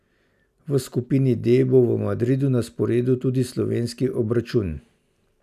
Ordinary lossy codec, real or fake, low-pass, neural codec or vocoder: none; real; 14.4 kHz; none